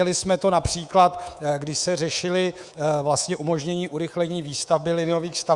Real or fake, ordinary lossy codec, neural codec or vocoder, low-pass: fake; Opus, 64 kbps; codec, 24 kHz, 3.1 kbps, DualCodec; 10.8 kHz